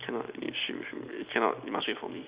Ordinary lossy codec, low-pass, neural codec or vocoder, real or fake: Opus, 64 kbps; 3.6 kHz; none; real